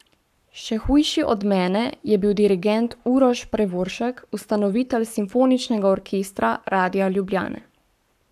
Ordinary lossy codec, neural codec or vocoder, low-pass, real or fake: none; codec, 44.1 kHz, 7.8 kbps, Pupu-Codec; 14.4 kHz; fake